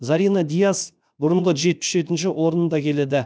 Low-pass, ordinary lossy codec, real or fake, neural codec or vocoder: none; none; fake; codec, 16 kHz, 0.7 kbps, FocalCodec